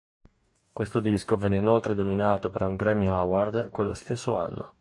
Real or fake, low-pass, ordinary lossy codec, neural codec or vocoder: fake; 10.8 kHz; AAC, 64 kbps; codec, 44.1 kHz, 2.6 kbps, DAC